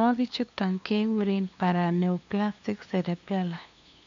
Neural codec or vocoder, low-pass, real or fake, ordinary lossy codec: codec, 16 kHz, 2 kbps, FunCodec, trained on LibriTTS, 25 frames a second; 7.2 kHz; fake; MP3, 64 kbps